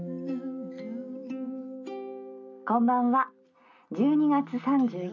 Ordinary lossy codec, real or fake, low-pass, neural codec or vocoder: none; real; 7.2 kHz; none